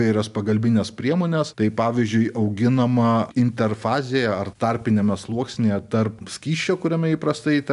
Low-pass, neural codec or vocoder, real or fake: 10.8 kHz; none; real